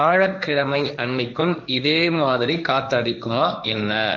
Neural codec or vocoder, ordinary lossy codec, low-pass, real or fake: codec, 16 kHz, 1.1 kbps, Voila-Tokenizer; none; 7.2 kHz; fake